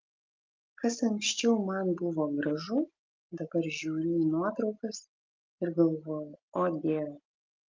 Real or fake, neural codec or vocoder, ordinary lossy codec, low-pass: real; none; Opus, 32 kbps; 7.2 kHz